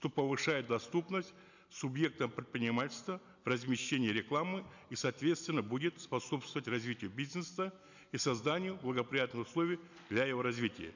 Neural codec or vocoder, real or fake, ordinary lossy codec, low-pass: none; real; none; 7.2 kHz